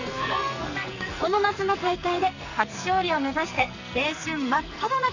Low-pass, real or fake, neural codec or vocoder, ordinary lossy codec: 7.2 kHz; fake; codec, 44.1 kHz, 2.6 kbps, SNAC; none